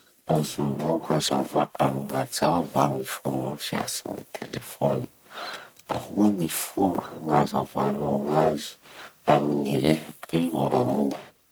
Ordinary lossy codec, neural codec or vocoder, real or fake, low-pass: none; codec, 44.1 kHz, 1.7 kbps, Pupu-Codec; fake; none